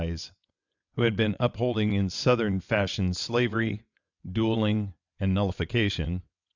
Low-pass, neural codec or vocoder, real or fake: 7.2 kHz; vocoder, 22.05 kHz, 80 mel bands, WaveNeXt; fake